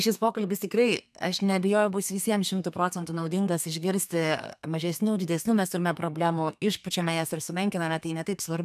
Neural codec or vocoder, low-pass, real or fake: codec, 32 kHz, 1.9 kbps, SNAC; 14.4 kHz; fake